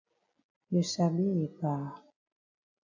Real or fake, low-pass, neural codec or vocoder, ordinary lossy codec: real; 7.2 kHz; none; AAC, 48 kbps